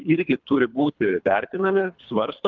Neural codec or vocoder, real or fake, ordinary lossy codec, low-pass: codec, 24 kHz, 3 kbps, HILCodec; fake; Opus, 24 kbps; 7.2 kHz